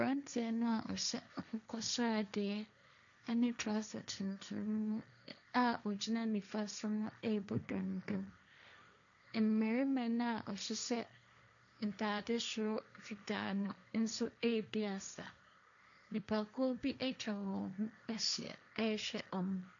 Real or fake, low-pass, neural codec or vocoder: fake; 7.2 kHz; codec, 16 kHz, 1.1 kbps, Voila-Tokenizer